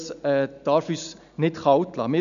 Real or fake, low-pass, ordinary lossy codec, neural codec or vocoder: real; 7.2 kHz; none; none